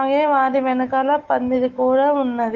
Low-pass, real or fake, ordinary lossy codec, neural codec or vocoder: 7.2 kHz; fake; Opus, 16 kbps; autoencoder, 48 kHz, 128 numbers a frame, DAC-VAE, trained on Japanese speech